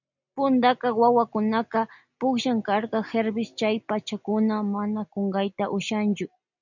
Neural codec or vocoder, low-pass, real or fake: none; 7.2 kHz; real